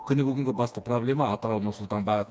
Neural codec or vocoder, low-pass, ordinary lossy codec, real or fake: codec, 16 kHz, 2 kbps, FreqCodec, smaller model; none; none; fake